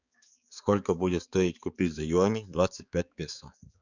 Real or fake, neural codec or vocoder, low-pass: fake; codec, 16 kHz, 4 kbps, X-Codec, HuBERT features, trained on balanced general audio; 7.2 kHz